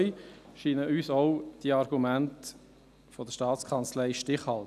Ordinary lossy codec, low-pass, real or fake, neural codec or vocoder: none; 14.4 kHz; real; none